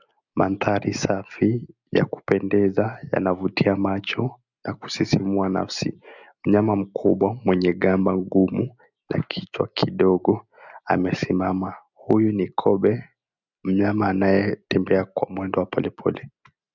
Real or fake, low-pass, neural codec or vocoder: real; 7.2 kHz; none